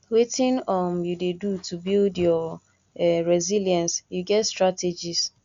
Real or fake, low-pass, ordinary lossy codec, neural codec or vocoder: real; 7.2 kHz; Opus, 64 kbps; none